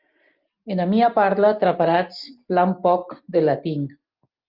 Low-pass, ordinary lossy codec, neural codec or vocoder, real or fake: 5.4 kHz; Opus, 24 kbps; none; real